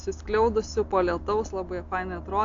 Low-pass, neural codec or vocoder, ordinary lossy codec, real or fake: 7.2 kHz; none; AAC, 48 kbps; real